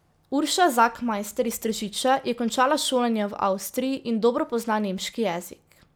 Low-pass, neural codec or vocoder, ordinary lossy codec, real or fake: none; none; none; real